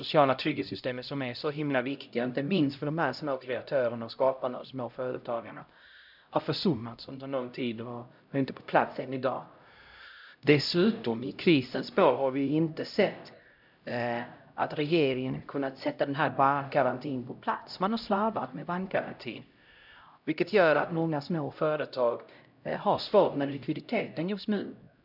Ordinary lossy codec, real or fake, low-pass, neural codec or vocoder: none; fake; 5.4 kHz; codec, 16 kHz, 0.5 kbps, X-Codec, HuBERT features, trained on LibriSpeech